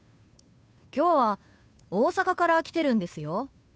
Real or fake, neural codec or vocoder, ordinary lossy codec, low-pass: fake; codec, 16 kHz, 2 kbps, FunCodec, trained on Chinese and English, 25 frames a second; none; none